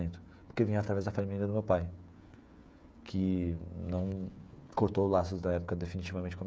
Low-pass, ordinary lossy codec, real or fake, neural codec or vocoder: none; none; fake; codec, 16 kHz, 16 kbps, FreqCodec, smaller model